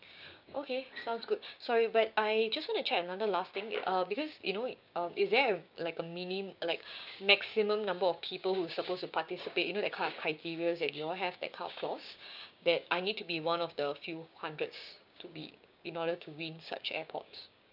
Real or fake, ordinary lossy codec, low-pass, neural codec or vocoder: fake; none; 5.4 kHz; codec, 16 kHz, 6 kbps, DAC